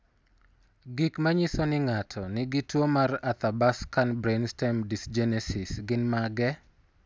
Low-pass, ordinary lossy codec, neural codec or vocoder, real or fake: none; none; none; real